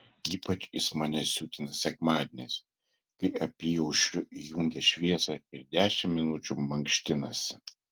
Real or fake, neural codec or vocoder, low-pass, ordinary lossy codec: real; none; 19.8 kHz; Opus, 24 kbps